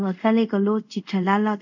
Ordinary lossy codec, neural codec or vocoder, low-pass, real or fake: none; codec, 24 kHz, 0.5 kbps, DualCodec; 7.2 kHz; fake